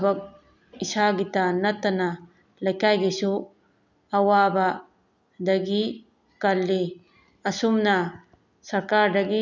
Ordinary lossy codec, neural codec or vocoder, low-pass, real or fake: none; none; 7.2 kHz; real